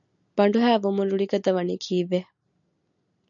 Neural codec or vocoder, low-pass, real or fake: none; 7.2 kHz; real